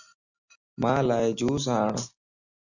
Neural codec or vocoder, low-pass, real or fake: none; 7.2 kHz; real